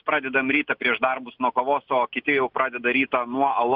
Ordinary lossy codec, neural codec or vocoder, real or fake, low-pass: Opus, 64 kbps; none; real; 5.4 kHz